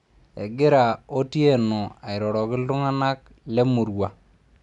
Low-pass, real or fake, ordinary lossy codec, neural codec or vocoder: 10.8 kHz; real; none; none